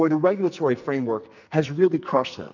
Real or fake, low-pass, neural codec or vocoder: fake; 7.2 kHz; codec, 44.1 kHz, 2.6 kbps, SNAC